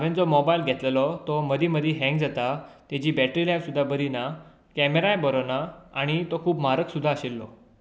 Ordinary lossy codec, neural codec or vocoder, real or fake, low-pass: none; none; real; none